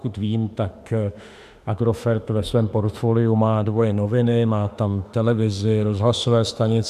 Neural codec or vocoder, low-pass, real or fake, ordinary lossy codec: autoencoder, 48 kHz, 32 numbers a frame, DAC-VAE, trained on Japanese speech; 14.4 kHz; fake; AAC, 96 kbps